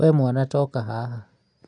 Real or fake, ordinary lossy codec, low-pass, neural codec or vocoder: real; none; 9.9 kHz; none